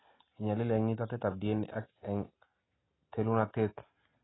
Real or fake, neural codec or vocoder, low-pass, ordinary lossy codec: real; none; 7.2 kHz; AAC, 16 kbps